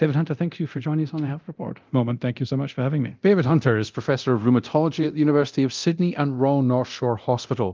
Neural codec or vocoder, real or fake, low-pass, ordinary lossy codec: codec, 24 kHz, 0.9 kbps, DualCodec; fake; 7.2 kHz; Opus, 24 kbps